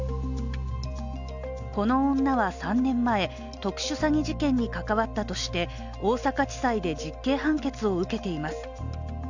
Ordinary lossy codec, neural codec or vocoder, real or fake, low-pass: none; none; real; 7.2 kHz